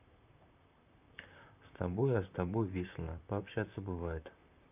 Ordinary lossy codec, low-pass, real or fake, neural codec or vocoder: none; 3.6 kHz; fake; vocoder, 44.1 kHz, 128 mel bands, Pupu-Vocoder